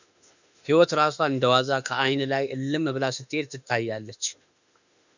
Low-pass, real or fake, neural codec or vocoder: 7.2 kHz; fake; autoencoder, 48 kHz, 32 numbers a frame, DAC-VAE, trained on Japanese speech